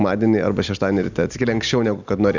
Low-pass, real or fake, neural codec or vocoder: 7.2 kHz; real; none